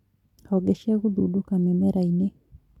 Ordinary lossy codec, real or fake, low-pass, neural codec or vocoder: none; real; 19.8 kHz; none